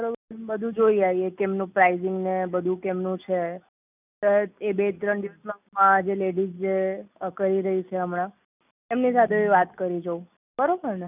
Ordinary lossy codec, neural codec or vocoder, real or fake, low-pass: none; none; real; 3.6 kHz